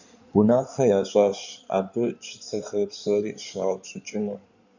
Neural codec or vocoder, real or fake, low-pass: codec, 16 kHz in and 24 kHz out, 2.2 kbps, FireRedTTS-2 codec; fake; 7.2 kHz